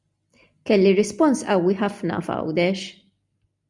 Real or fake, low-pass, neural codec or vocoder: real; 10.8 kHz; none